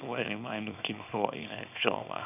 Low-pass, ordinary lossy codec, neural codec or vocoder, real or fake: 3.6 kHz; none; codec, 24 kHz, 0.9 kbps, WavTokenizer, small release; fake